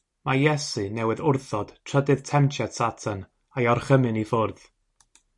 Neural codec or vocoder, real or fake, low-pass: none; real; 10.8 kHz